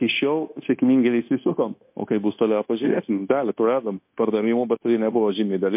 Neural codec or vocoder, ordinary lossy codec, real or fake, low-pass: codec, 16 kHz, 0.9 kbps, LongCat-Audio-Codec; MP3, 32 kbps; fake; 3.6 kHz